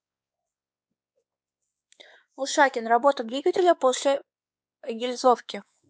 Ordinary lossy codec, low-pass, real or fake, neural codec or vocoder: none; none; fake; codec, 16 kHz, 4 kbps, X-Codec, WavLM features, trained on Multilingual LibriSpeech